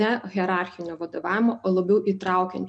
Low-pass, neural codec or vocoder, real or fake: 9.9 kHz; none; real